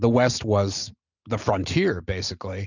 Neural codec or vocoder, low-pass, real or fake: none; 7.2 kHz; real